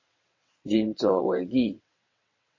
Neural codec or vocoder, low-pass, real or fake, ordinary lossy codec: vocoder, 22.05 kHz, 80 mel bands, WaveNeXt; 7.2 kHz; fake; MP3, 32 kbps